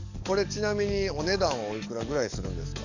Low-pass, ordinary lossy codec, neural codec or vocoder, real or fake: 7.2 kHz; none; codec, 44.1 kHz, 7.8 kbps, DAC; fake